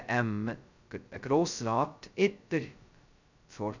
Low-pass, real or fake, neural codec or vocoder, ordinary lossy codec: 7.2 kHz; fake; codec, 16 kHz, 0.2 kbps, FocalCodec; none